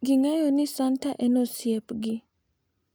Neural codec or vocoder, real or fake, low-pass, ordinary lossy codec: none; real; none; none